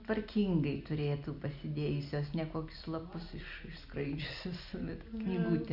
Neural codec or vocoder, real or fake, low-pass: none; real; 5.4 kHz